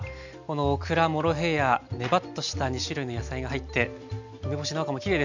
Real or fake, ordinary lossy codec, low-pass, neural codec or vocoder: real; none; 7.2 kHz; none